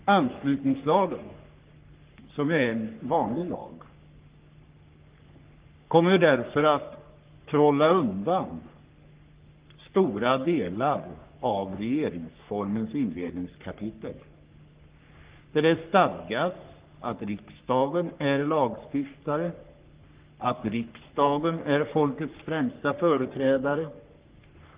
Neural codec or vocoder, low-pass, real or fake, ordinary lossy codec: codec, 44.1 kHz, 3.4 kbps, Pupu-Codec; 3.6 kHz; fake; Opus, 32 kbps